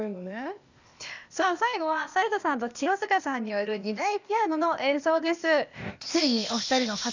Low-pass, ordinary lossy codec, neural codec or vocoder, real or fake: 7.2 kHz; none; codec, 16 kHz, 0.8 kbps, ZipCodec; fake